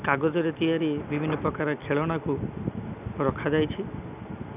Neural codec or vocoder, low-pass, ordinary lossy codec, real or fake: none; 3.6 kHz; none; real